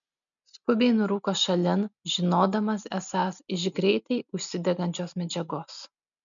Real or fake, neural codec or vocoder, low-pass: real; none; 7.2 kHz